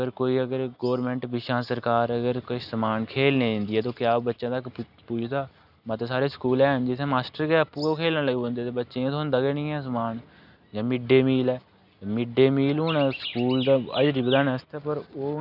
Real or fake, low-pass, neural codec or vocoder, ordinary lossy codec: real; 5.4 kHz; none; none